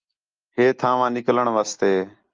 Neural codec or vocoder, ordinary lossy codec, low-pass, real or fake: none; Opus, 32 kbps; 7.2 kHz; real